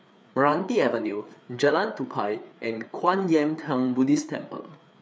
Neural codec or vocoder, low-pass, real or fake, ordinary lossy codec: codec, 16 kHz, 4 kbps, FreqCodec, larger model; none; fake; none